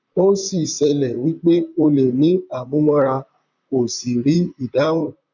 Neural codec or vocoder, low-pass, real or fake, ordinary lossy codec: vocoder, 44.1 kHz, 128 mel bands, Pupu-Vocoder; 7.2 kHz; fake; none